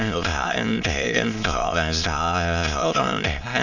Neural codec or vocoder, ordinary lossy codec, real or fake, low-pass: autoencoder, 22.05 kHz, a latent of 192 numbers a frame, VITS, trained on many speakers; none; fake; 7.2 kHz